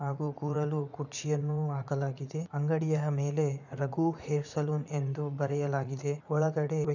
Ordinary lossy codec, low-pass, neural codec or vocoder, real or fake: none; 7.2 kHz; vocoder, 44.1 kHz, 80 mel bands, Vocos; fake